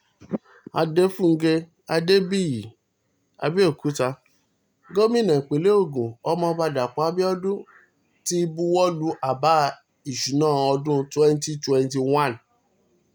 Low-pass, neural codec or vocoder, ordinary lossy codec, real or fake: none; none; none; real